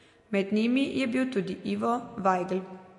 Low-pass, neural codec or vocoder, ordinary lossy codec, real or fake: 10.8 kHz; none; MP3, 48 kbps; real